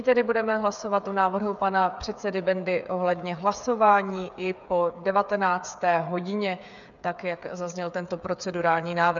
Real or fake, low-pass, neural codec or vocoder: fake; 7.2 kHz; codec, 16 kHz, 8 kbps, FreqCodec, smaller model